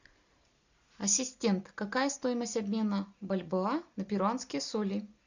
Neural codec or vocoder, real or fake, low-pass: none; real; 7.2 kHz